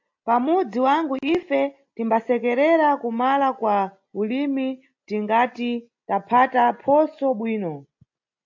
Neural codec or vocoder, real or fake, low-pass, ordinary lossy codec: none; real; 7.2 kHz; AAC, 48 kbps